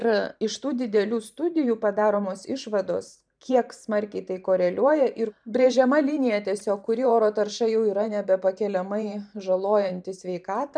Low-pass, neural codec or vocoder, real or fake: 9.9 kHz; vocoder, 44.1 kHz, 128 mel bands every 512 samples, BigVGAN v2; fake